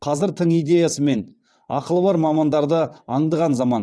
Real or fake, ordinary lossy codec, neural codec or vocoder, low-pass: fake; none; vocoder, 22.05 kHz, 80 mel bands, WaveNeXt; none